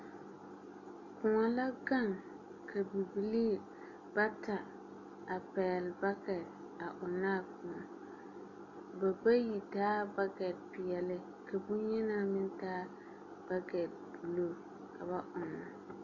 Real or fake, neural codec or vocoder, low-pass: real; none; 7.2 kHz